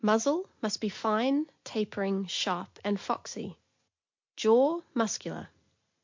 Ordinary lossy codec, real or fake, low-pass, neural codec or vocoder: MP3, 48 kbps; real; 7.2 kHz; none